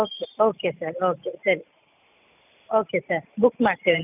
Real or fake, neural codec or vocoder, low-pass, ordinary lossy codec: real; none; 3.6 kHz; none